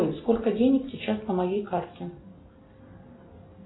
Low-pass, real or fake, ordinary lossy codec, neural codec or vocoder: 7.2 kHz; real; AAC, 16 kbps; none